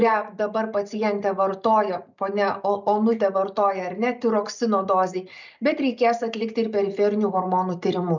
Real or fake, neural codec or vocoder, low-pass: real; none; 7.2 kHz